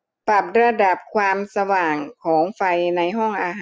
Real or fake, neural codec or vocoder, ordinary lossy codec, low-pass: real; none; none; none